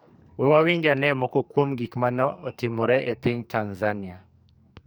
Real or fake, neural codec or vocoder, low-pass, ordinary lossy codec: fake; codec, 44.1 kHz, 2.6 kbps, SNAC; none; none